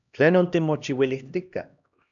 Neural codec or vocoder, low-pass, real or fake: codec, 16 kHz, 1 kbps, X-Codec, HuBERT features, trained on LibriSpeech; 7.2 kHz; fake